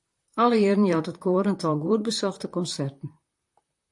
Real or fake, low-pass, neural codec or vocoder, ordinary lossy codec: fake; 10.8 kHz; vocoder, 44.1 kHz, 128 mel bands, Pupu-Vocoder; AAC, 64 kbps